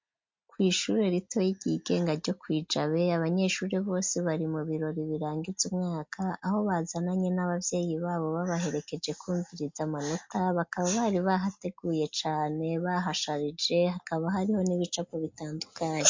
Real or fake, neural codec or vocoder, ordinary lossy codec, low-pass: real; none; MP3, 48 kbps; 7.2 kHz